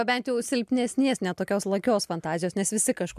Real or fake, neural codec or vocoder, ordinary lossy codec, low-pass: real; none; MP3, 96 kbps; 14.4 kHz